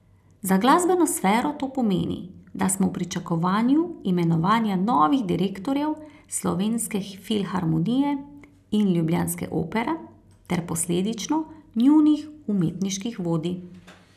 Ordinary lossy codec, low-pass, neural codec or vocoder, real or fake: none; 14.4 kHz; none; real